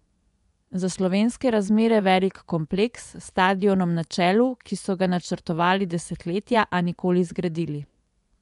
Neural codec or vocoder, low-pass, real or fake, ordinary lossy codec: vocoder, 24 kHz, 100 mel bands, Vocos; 10.8 kHz; fake; none